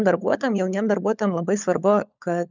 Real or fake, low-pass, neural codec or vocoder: fake; 7.2 kHz; codec, 16 kHz, 16 kbps, FunCodec, trained on LibriTTS, 50 frames a second